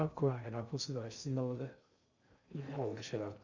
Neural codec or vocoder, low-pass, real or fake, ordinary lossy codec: codec, 16 kHz in and 24 kHz out, 0.6 kbps, FocalCodec, streaming, 2048 codes; 7.2 kHz; fake; Opus, 64 kbps